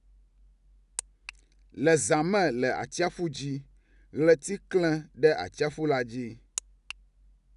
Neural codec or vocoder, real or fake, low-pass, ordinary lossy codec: none; real; 10.8 kHz; none